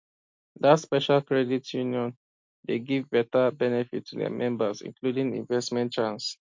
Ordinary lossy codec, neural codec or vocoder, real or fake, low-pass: MP3, 48 kbps; none; real; 7.2 kHz